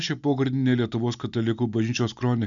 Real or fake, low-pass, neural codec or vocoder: real; 7.2 kHz; none